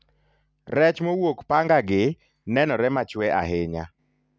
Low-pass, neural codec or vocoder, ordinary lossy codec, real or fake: none; none; none; real